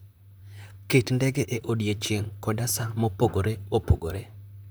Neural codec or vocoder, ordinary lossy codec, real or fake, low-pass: vocoder, 44.1 kHz, 128 mel bands, Pupu-Vocoder; none; fake; none